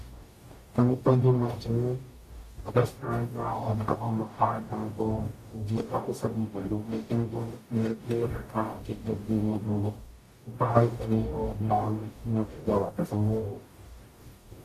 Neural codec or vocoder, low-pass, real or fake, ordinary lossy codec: codec, 44.1 kHz, 0.9 kbps, DAC; 14.4 kHz; fake; AAC, 64 kbps